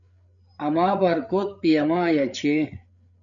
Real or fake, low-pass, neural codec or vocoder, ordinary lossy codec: fake; 7.2 kHz; codec, 16 kHz, 8 kbps, FreqCodec, larger model; MP3, 64 kbps